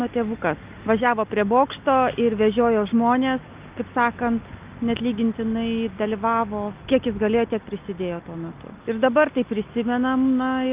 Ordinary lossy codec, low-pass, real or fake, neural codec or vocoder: Opus, 24 kbps; 3.6 kHz; real; none